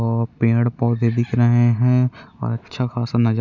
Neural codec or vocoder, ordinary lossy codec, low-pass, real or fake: none; none; 7.2 kHz; real